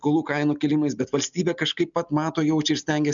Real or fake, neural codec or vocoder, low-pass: real; none; 7.2 kHz